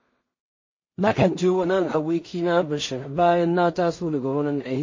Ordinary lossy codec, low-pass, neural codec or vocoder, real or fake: MP3, 32 kbps; 7.2 kHz; codec, 16 kHz in and 24 kHz out, 0.4 kbps, LongCat-Audio-Codec, two codebook decoder; fake